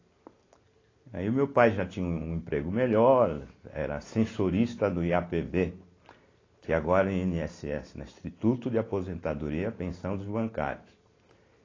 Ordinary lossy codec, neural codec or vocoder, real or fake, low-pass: AAC, 32 kbps; none; real; 7.2 kHz